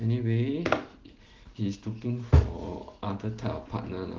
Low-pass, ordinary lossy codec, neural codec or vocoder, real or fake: 7.2 kHz; Opus, 16 kbps; none; real